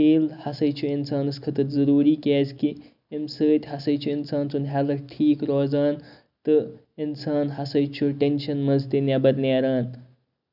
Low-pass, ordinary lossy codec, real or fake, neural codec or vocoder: 5.4 kHz; none; real; none